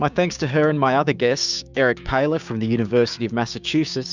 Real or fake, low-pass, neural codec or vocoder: fake; 7.2 kHz; codec, 16 kHz, 6 kbps, DAC